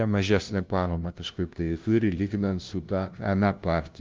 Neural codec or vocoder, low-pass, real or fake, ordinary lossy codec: codec, 16 kHz, 0.5 kbps, FunCodec, trained on LibriTTS, 25 frames a second; 7.2 kHz; fake; Opus, 32 kbps